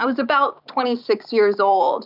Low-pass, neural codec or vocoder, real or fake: 5.4 kHz; none; real